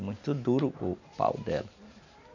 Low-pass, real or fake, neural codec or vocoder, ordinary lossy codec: 7.2 kHz; real; none; none